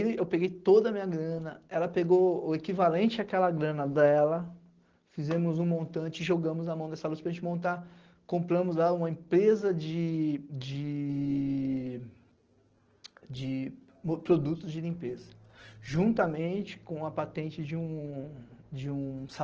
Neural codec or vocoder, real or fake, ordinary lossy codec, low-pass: none; real; Opus, 16 kbps; 7.2 kHz